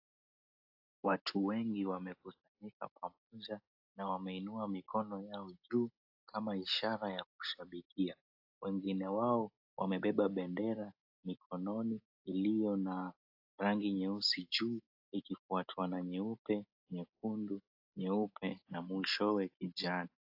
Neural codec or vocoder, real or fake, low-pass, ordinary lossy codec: none; real; 5.4 kHz; AAC, 48 kbps